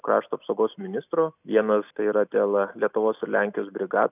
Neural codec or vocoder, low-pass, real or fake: none; 3.6 kHz; real